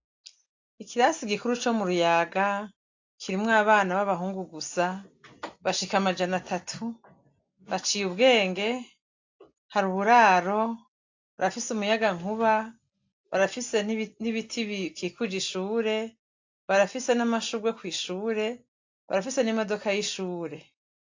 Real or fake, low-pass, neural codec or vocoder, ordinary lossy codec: real; 7.2 kHz; none; AAC, 48 kbps